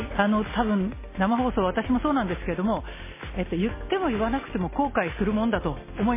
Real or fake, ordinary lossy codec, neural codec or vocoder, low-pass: real; MP3, 16 kbps; none; 3.6 kHz